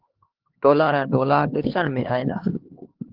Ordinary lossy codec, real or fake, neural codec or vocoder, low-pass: Opus, 16 kbps; fake; codec, 16 kHz, 2 kbps, X-Codec, HuBERT features, trained on LibriSpeech; 5.4 kHz